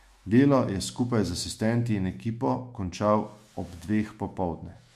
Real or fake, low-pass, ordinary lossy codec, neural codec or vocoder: fake; 14.4 kHz; MP3, 64 kbps; autoencoder, 48 kHz, 128 numbers a frame, DAC-VAE, trained on Japanese speech